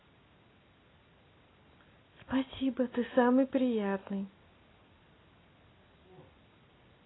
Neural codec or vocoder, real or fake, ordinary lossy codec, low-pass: none; real; AAC, 16 kbps; 7.2 kHz